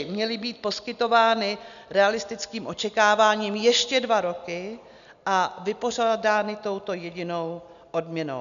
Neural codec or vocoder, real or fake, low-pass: none; real; 7.2 kHz